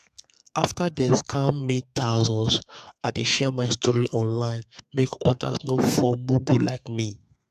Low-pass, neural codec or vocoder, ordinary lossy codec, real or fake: 14.4 kHz; codec, 32 kHz, 1.9 kbps, SNAC; AAC, 96 kbps; fake